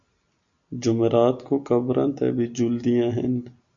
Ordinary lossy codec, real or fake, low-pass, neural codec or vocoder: AAC, 64 kbps; real; 7.2 kHz; none